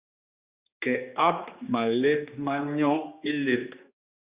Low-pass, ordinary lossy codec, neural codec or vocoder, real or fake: 3.6 kHz; Opus, 64 kbps; codec, 16 kHz, 2 kbps, X-Codec, HuBERT features, trained on general audio; fake